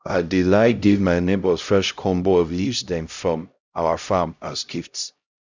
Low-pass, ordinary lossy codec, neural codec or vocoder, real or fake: 7.2 kHz; Opus, 64 kbps; codec, 16 kHz, 0.5 kbps, X-Codec, HuBERT features, trained on LibriSpeech; fake